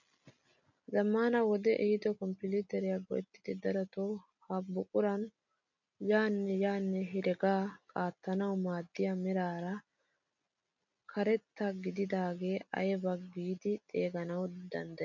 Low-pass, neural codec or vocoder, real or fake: 7.2 kHz; none; real